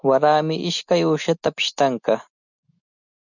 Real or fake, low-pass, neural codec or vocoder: real; 7.2 kHz; none